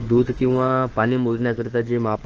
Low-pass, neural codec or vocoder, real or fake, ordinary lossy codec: 7.2 kHz; autoencoder, 48 kHz, 32 numbers a frame, DAC-VAE, trained on Japanese speech; fake; Opus, 16 kbps